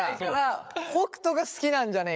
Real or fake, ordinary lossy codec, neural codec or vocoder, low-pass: fake; none; codec, 16 kHz, 8 kbps, FreqCodec, larger model; none